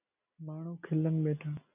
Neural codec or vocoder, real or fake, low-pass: none; real; 3.6 kHz